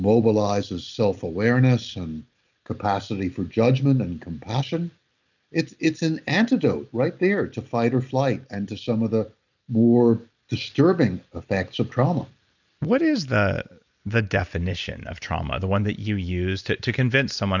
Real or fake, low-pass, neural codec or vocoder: real; 7.2 kHz; none